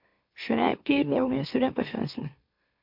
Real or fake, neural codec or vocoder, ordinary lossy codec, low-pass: fake; autoencoder, 44.1 kHz, a latent of 192 numbers a frame, MeloTTS; MP3, 48 kbps; 5.4 kHz